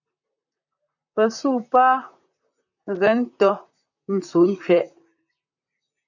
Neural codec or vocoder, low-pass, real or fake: vocoder, 44.1 kHz, 128 mel bands, Pupu-Vocoder; 7.2 kHz; fake